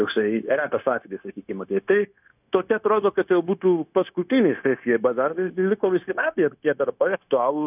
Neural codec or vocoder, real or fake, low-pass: codec, 16 kHz, 0.9 kbps, LongCat-Audio-Codec; fake; 3.6 kHz